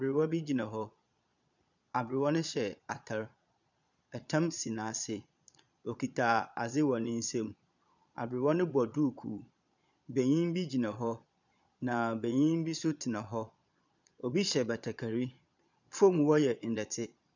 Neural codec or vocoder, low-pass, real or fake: vocoder, 22.05 kHz, 80 mel bands, Vocos; 7.2 kHz; fake